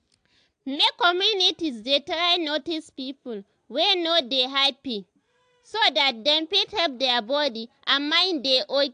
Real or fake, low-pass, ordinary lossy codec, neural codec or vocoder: real; 9.9 kHz; AAC, 64 kbps; none